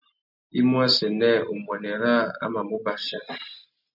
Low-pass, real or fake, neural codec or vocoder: 5.4 kHz; real; none